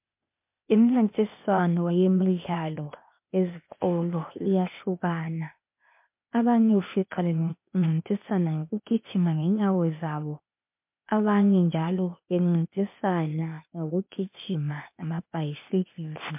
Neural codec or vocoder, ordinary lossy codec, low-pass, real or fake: codec, 16 kHz, 0.8 kbps, ZipCodec; MP3, 32 kbps; 3.6 kHz; fake